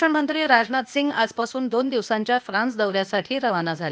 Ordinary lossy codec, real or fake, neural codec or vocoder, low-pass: none; fake; codec, 16 kHz, 0.8 kbps, ZipCodec; none